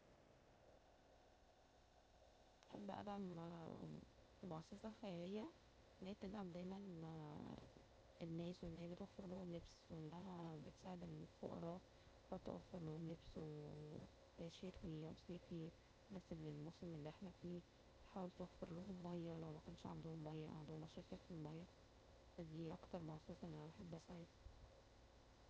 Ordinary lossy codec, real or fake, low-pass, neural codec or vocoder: none; fake; none; codec, 16 kHz, 0.8 kbps, ZipCodec